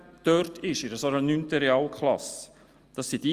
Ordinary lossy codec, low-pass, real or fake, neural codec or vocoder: Opus, 32 kbps; 14.4 kHz; real; none